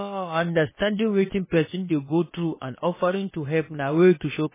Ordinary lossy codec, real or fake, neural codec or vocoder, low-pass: MP3, 16 kbps; fake; codec, 16 kHz, about 1 kbps, DyCAST, with the encoder's durations; 3.6 kHz